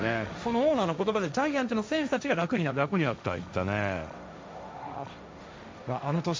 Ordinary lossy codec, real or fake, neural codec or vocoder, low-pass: none; fake; codec, 16 kHz, 1.1 kbps, Voila-Tokenizer; none